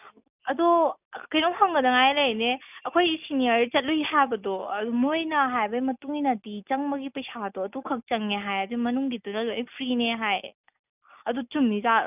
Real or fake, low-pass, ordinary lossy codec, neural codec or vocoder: real; 3.6 kHz; none; none